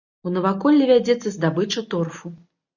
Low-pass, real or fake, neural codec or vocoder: 7.2 kHz; real; none